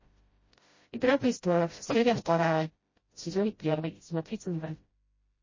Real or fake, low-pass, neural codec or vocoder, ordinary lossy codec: fake; 7.2 kHz; codec, 16 kHz, 0.5 kbps, FreqCodec, smaller model; MP3, 32 kbps